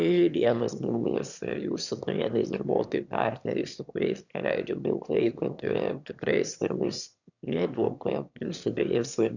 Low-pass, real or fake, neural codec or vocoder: 7.2 kHz; fake; autoencoder, 22.05 kHz, a latent of 192 numbers a frame, VITS, trained on one speaker